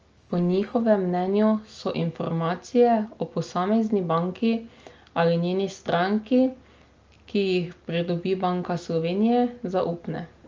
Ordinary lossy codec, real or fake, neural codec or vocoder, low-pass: Opus, 24 kbps; real; none; 7.2 kHz